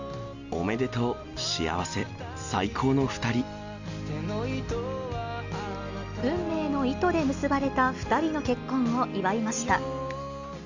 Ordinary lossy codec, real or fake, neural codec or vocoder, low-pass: Opus, 64 kbps; real; none; 7.2 kHz